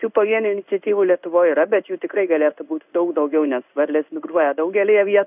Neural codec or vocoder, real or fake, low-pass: codec, 16 kHz in and 24 kHz out, 1 kbps, XY-Tokenizer; fake; 3.6 kHz